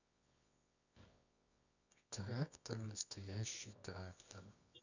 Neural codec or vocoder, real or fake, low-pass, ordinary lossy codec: codec, 24 kHz, 0.9 kbps, WavTokenizer, medium music audio release; fake; 7.2 kHz; none